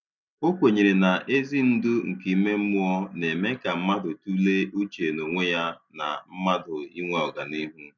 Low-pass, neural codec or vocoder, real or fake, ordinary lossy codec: 7.2 kHz; none; real; none